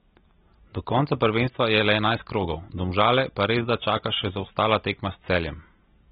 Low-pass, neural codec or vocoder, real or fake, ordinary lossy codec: 7.2 kHz; none; real; AAC, 16 kbps